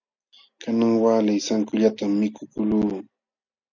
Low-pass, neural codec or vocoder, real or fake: 7.2 kHz; none; real